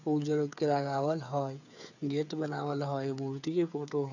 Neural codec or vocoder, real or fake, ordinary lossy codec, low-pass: codec, 16 kHz, 8 kbps, FreqCodec, smaller model; fake; none; 7.2 kHz